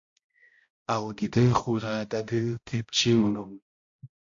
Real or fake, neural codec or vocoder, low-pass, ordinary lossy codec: fake; codec, 16 kHz, 0.5 kbps, X-Codec, HuBERT features, trained on balanced general audio; 7.2 kHz; AAC, 48 kbps